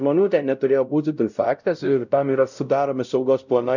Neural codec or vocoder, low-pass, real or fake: codec, 16 kHz, 0.5 kbps, X-Codec, WavLM features, trained on Multilingual LibriSpeech; 7.2 kHz; fake